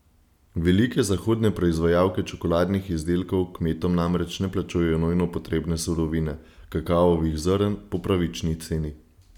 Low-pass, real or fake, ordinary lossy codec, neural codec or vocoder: 19.8 kHz; real; none; none